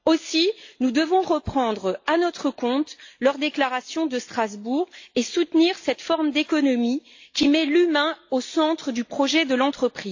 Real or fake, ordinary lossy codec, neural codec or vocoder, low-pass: real; AAC, 48 kbps; none; 7.2 kHz